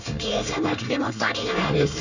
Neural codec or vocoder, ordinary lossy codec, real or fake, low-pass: codec, 24 kHz, 1 kbps, SNAC; none; fake; 7.2 kHz